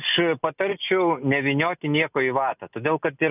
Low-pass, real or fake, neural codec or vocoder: 3.6 kHz; real; none